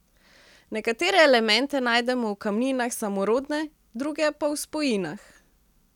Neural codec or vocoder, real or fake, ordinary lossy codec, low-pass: none; real; none; 19.8 kHz